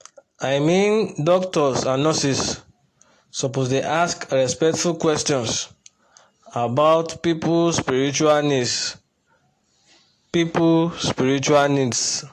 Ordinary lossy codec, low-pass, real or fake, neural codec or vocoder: AAC, 48 kbps; 14.4 kHz; real; none